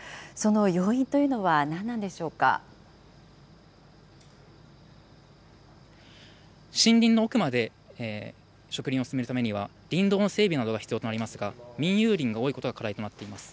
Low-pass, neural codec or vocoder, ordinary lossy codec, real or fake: none; none; none; real